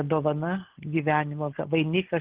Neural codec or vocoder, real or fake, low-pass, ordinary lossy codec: none; real; 3.6 kHz; Opus, 16 kbps